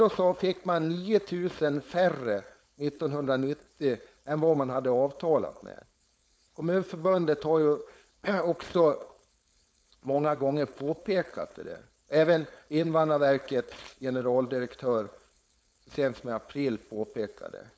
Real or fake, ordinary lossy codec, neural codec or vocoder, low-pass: fake; none; codec, 16 kHz, 4.8 kbps, FACodec; none